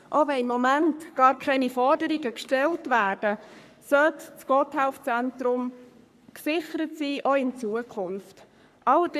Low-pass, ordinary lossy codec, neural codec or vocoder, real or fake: 14.4 kHz; none; codec, 44.1 kHz, 3.4 kbps, Pupu-Codec; fake